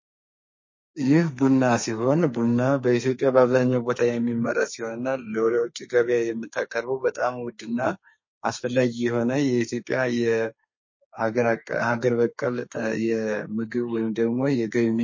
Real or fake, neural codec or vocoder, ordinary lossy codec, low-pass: fake; codec, 32 kHz, 1.9 kbps, SNAC; MP3, 32 kbps; 7.2 kHz